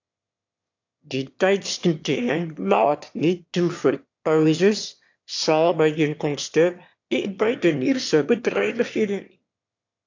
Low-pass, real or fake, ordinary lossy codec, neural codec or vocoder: 7.2 kHz; fake; AAC, 48 kbps; autoencoder, 22.05 kHz, a latent of 192 numbers a frame, VITS, trained on one speaker